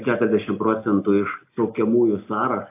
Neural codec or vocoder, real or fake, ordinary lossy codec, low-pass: none; real; AAC, 32 kbps; 3.6 kHz